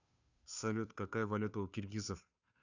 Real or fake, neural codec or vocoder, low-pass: fake; codec, 16 kHz, 2 kbps, FunCodec, trained on Chinese and English, 25 frames a second; 7.2 kHz